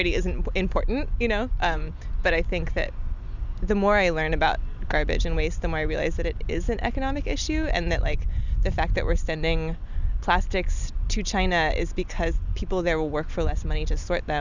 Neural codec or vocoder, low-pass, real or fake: none; 7.2 kHz; real